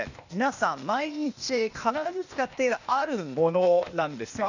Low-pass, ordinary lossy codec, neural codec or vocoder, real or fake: 7.2 kHz; none; codec, 16 kHz, 0.8 kbps, ZipCodec; fake